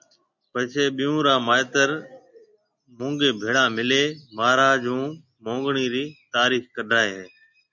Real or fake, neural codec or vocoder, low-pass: real; none; 7.2 kHz